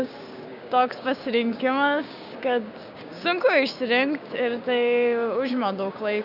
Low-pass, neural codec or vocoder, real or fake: 5.4 kHz; codec, 16 kHz, 6 kbps, DAC; fake